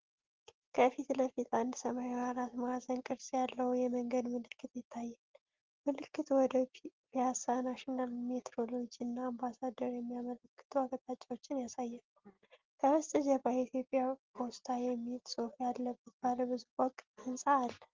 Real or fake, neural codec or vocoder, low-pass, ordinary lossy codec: real; none; 7.2 kHz; Opus, 16 kbps